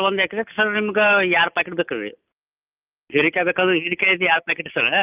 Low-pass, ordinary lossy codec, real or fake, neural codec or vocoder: 3.6 kHz; Opus, 24 kbps; real; none